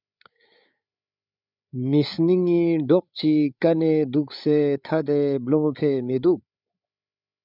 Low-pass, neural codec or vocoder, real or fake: 5.4 kHz; codec, 16 kHz, 8 kbps, FreqCodec, larger model; fake